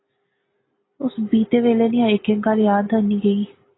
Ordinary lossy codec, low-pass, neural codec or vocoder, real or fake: AAC, 16 kbps; 7.2 kHz; none; real